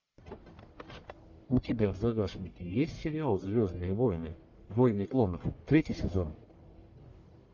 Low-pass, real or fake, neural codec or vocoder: 7.2 kHz; fake; codec, 44.1 kHz, 1.7 kbps, Pupu-Codec